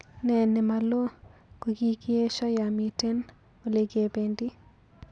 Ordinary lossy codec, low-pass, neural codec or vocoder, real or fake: none; 9.9 kHz; none; real